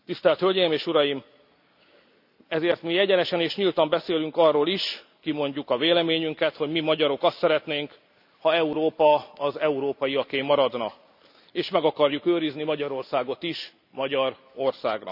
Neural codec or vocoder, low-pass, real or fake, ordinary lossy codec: none; 5.4 kHz; real; none